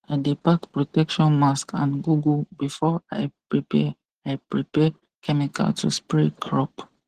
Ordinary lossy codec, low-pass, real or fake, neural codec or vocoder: Opus, 16 kbps; 14.4 kHz; real; none